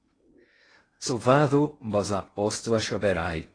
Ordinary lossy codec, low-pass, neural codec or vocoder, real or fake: AAC, 32 kbps; 9.9 kHz; codec, 16 kHz in and 24 kHz out, 0.6 kbps, FocalCodec, streaming, 2048 codes; fake